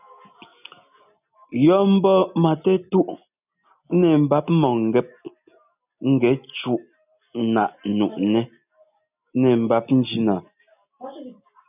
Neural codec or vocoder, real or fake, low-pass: none; real; 3.6 kHz